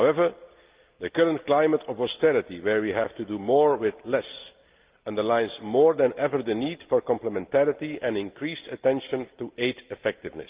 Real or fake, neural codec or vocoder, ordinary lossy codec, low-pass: real; none; Opus, 32 kbps; 3.6 kHz